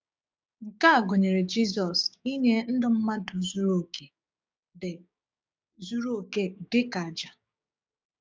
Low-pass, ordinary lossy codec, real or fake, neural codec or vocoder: none; none; fake; codec, 16 kHz, 6 kbps, DAC